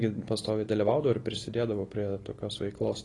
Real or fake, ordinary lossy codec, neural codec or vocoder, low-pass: real; AAC, 32 kbps; none; 10.8 kHz